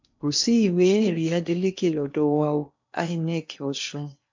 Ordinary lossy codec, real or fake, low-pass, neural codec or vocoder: MP3, 64 kbps; fake; 7.2 kHz; codec, 16 kHz in and 24 kHz out, 0.8 kbps, FocalCodec, streaming, 65536 codes